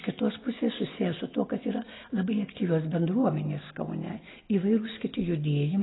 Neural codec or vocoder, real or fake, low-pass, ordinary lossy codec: none; real; 7.2 kHz; AAC, 16 kbps